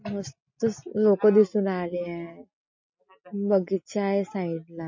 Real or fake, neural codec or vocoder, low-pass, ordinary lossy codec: real; none; 7.2 kHz; MP3, 32 kbps